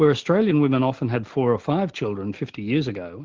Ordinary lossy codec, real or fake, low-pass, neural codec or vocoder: Opus, 16 kbps; real; 7.2 kHz; none